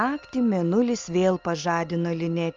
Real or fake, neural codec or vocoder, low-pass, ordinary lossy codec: real; none; 7.2 kHz; Opus, 32 kbps